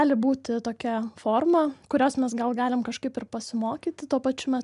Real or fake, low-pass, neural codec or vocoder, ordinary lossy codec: real; 10.8 kHz; none; MP3, 96 kbps